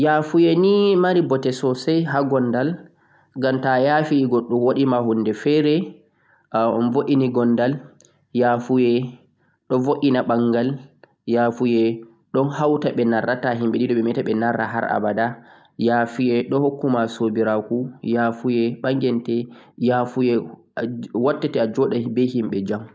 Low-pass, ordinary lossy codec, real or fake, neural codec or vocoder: none; none; real; none